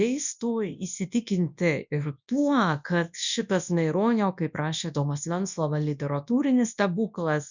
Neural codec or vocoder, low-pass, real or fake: codec, 24 kHz, 0.9 kbps, WavTokenizer, large speech release; 7.2 kHz; fake